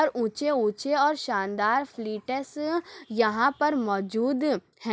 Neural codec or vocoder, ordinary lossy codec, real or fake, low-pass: none; none; real; none